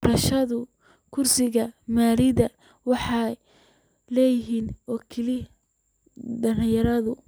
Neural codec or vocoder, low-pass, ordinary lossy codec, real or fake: none; none; none; real